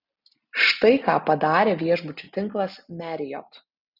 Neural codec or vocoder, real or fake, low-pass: none; real; 5.4 kHz